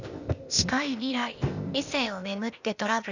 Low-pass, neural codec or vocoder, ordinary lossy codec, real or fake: 7.2 kHz; codec, 16 kHz, 0.8 kbps, ZipCodec; none; fake